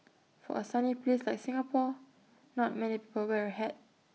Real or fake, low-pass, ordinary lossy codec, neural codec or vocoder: real; none; none; none